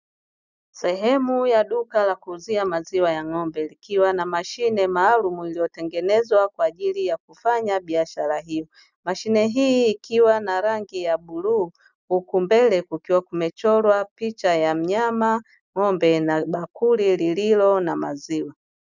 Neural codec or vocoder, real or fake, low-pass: none; real; 7.2 kHz